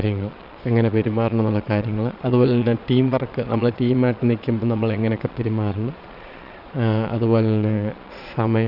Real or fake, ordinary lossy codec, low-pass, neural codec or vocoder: fake; none; 5.4 kHz; vocoder, 22.05 kHz, 80 mel bands, Vocos